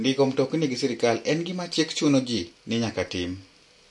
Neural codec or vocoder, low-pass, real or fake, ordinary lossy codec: none; 10.8 kHz; real; MP3, 48 kbps